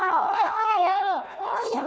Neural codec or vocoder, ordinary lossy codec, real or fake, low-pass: codec, 16 kHz, 1 kbps, FunCodec, trained on Chinese and English, 50 frames a second; none; fake; none